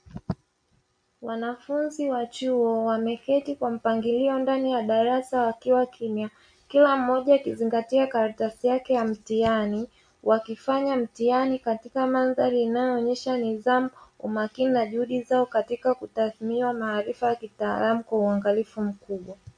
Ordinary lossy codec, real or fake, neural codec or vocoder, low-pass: MP3, 48 kbps; real; none; 9.9 kHz